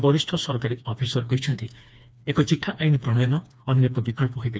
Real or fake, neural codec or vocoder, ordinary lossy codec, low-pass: fake; codec, 16 kHz, 2 kbps, FreqCodec, smaller model; none; none